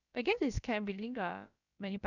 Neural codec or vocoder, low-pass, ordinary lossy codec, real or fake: codec, 16 kHz, about 1 kbps, DyCAST, with the encoder's durations; 7.2 kHz; none; fake